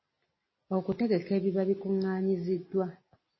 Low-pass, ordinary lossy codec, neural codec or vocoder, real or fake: 7.2 kHz; MP3, 24 kbps; none; real